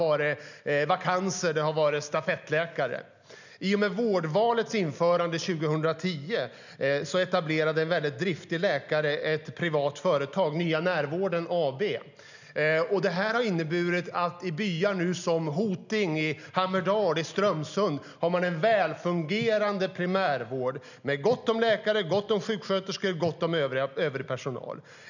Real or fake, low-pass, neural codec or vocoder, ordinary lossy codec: real; 7.2 kHz; none; none